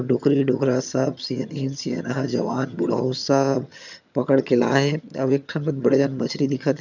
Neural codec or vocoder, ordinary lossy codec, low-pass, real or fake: vocoder, 22.05 kHz, 80 mel bands, HiFi-GAN; none; 7.2 kHz; fake